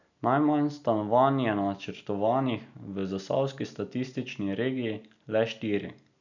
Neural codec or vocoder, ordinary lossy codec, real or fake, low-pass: none; none; real; 7.2 kHz